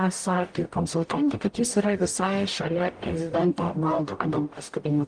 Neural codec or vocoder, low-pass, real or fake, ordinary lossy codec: codec, 44.1 kHz, 0.9 kbps, DAC; 9.9 kHz; fake; Opus, 24 kbps